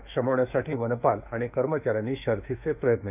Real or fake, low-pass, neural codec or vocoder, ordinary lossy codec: fake; 3.6 kHz; autoencoder, 48 kHz, 32 numbers a frame, DAC-VAE, trained on Japanese speech; none